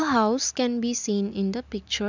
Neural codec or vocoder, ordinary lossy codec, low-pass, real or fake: none; none; 7.2 kHz; real